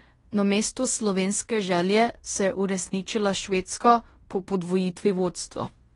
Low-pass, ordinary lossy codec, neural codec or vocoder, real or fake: 10.8 kHz; AAC, 32 kbps; codec, 16 kHz in and 24 kHz out, 0.9 kbps, LongCat-Audio-Codec, fine tuned four codebook decoder; fake